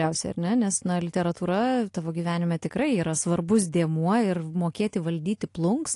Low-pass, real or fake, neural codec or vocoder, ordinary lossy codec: 10.8 kHz; real; none; AAC, 48 kbps